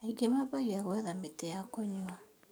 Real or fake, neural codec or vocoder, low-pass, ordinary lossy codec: fake; codec, 44.1 kHz, 7.8 kbps, DAC; none; none